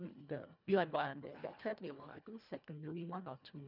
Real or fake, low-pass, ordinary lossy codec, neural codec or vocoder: fake; 5.4 kHz; none; codec, 24 kHz, 1.5 kbps, HILCodec